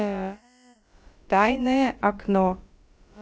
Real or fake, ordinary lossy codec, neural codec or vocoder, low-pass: fake; none; codec, 16 kHz, about 1 kbps, DyCAST, with the encoder's durations; none